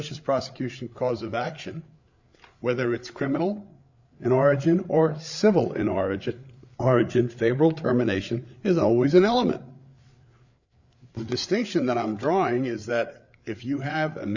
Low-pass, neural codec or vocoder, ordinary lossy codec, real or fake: 7.2 kHz; codec, 16 kHz, 8 kbps, FreqCodec, larger model; Opus, 64 kbps; fake